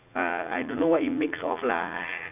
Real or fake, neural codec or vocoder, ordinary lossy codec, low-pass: fake; vocoder, 44.1 kHz, 80 mel bands, Vocos; none; 3.6 kHz